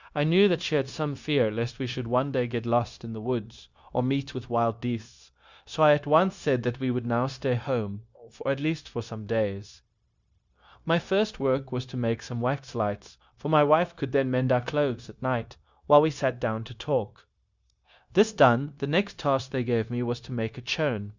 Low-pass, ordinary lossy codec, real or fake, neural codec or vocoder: 7.2 kHz; Opus, 64 kbps; fake; codec, 16 kHz, 0.9 kbps, LongCat-Audio-Codec